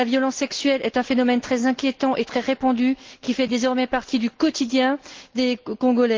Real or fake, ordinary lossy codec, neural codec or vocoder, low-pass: real; Opus, 16 kbps; none; 7.2 kHz